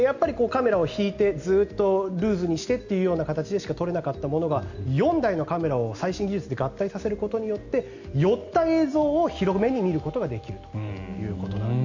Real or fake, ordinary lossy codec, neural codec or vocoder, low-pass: real; Opus, 64 kbps; none; 7.2 kHz